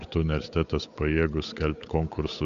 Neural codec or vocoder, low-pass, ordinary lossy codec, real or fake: codec, 16 kHz, 8 kbps, FunCodec, trained on Chinese and English, 25 frames a second; 7.2 kHz; AAC, 96 kbps; fake